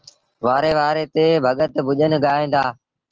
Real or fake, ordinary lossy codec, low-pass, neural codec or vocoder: real; Opus, 16 kbps; 7.2 kHz; none